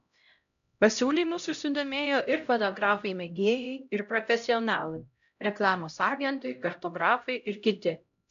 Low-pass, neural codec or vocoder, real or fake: 7.2 kHz; codec, 16 kHz, 0.5 kbps, X-Codec, HuBERT features, trained on LibriSpeech; fake